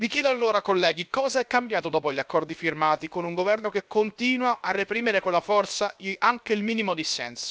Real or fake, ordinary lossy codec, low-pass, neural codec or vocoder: fake; none; none; codec, 16 kHz, 0.7 kbps, FocalCodec